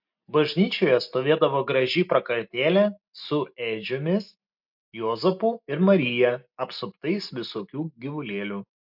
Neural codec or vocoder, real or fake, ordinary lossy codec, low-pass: none; real; MP3, 48 kbps; 5.4 kHz